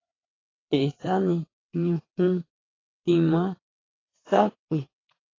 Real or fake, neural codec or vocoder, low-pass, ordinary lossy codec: fake; vocoder, 22.05 kHz, 80 mel bands, WaveNeXt; 7.2 kHz; AAC, 32 kbps